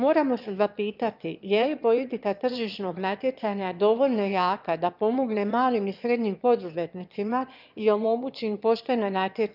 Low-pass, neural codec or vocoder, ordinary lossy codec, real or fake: 5.4 kHz; autoencoder, 22.05 kHz, a latent of 192 numbers a frame, VITS, trained on one speaker; none; fake